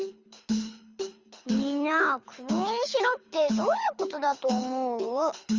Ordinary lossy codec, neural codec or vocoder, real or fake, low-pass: Opus, 32 kbps; codec, 24 kHz, 6 kbps, HILCodec; fake; 7.2 kHz